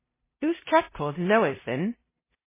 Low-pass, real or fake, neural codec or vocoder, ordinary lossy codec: 3.6 kHz; fake; codec, 16 kHz, 0.5 kbps, FunCodec, trained on LibriTTS, 25 frames a second; MP3, 16 kbps